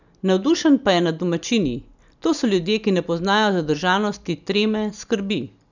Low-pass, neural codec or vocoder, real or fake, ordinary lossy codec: 7.2 kHz; none; real; none